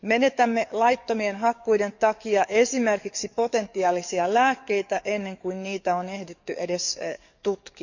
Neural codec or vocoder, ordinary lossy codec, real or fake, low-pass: codec, 44.1 kHz, 7.8 kbps, DAC; none; fake; 7.2 kHz